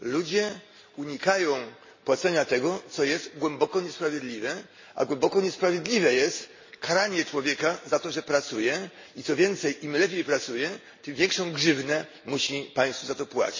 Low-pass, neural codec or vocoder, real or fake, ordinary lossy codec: 7.2 kHz; none; real; MP3, 32 kbps